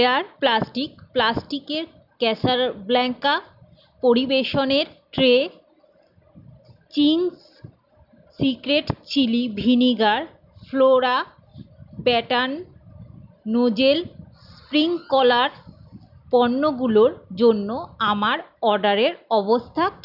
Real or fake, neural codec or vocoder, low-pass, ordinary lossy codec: real; none; 5.4 kHz; none